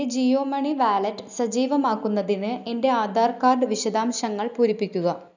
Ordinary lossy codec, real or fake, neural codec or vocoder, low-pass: none; real; none; 7.2 kHz